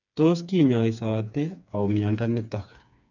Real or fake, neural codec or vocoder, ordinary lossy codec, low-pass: fake; codec, 16 kHz, 4 kbps, FreqCodec, smaller model; none; 7.2 kHz